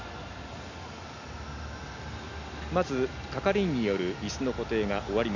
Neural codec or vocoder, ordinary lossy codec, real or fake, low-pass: none; none; real; 7.2 kHz